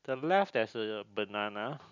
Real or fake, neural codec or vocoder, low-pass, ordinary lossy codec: real; none; 7.2 kHz; none